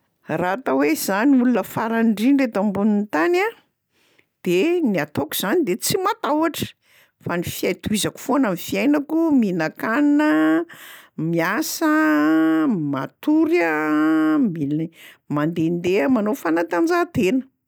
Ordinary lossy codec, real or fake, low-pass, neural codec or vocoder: none; real; none; none